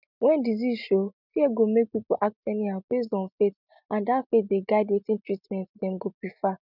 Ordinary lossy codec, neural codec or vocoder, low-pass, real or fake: none; none; 5.4 kHz; real